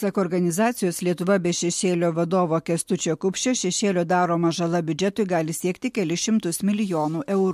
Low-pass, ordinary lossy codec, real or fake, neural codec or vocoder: 14.4 kHz; MP3, 64 kbps; real; none